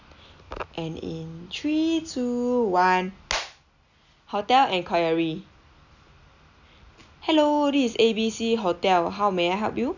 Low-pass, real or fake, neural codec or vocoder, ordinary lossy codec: 7.2 kHz; real; none; none